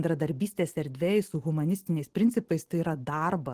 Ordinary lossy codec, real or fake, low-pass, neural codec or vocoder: Opus, 16 kbps; real; 14.4 kHz; none